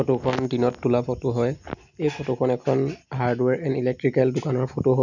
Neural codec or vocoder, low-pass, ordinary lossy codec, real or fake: none; 7.2 kHz; none; real